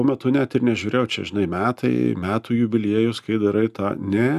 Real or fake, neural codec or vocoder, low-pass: real; none; 14.4 kHz